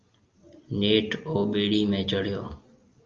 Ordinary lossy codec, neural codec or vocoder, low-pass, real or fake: Opus, 24 kbps; none; 7.2 kHz; real